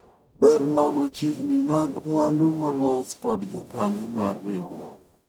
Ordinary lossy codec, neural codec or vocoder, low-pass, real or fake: none; codec, 44.1 kHz, 0.9 kbps, DAC; none; fake